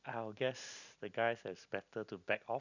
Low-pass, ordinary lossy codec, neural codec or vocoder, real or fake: 7.2 kHz; none; none; real